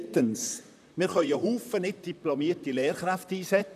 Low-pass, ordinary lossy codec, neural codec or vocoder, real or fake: 14.4 kHz; none; vocoder, 44.1 kHz, 128 mel bands, Pupu-Vocoder; fake